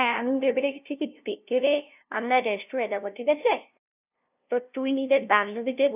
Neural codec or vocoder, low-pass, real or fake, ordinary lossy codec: codec, 16 kHz, 0.5 kbps, FunCodec, trained on LibriTTS, 25 frames a second; 3.6 kHz; fake; none